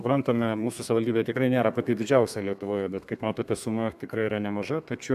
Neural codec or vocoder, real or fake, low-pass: codec, 32 kHz, 1.9 kbps, SNAC; fake; 14.4 kHz